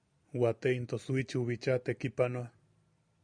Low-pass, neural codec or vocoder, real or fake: 9.9 kHz; none; real